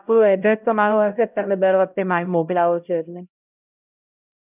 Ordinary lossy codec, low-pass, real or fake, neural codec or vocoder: none; 3.6 kHz; fake; codec, 16 kHz, 0.5 kbps, X-Codec, HuBERT features, trained on LibriSpeech